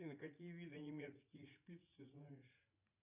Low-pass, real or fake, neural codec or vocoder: 3.6 kHz; fake; vocoder, 44.1 kHz, 80 mel bands, Vocos